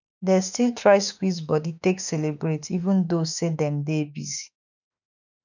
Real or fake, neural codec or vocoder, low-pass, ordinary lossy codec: fake; autoencoder, 48 kHz, 32 numbers a frame, DAC-VAE, trained on Japanese speech; 7.2 kHz; none